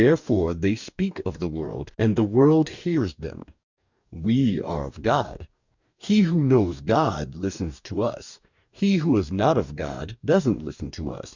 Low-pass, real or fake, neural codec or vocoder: 7.2 kHz; fake; codec, 44.1 kHz, 2.6 kbps, DAC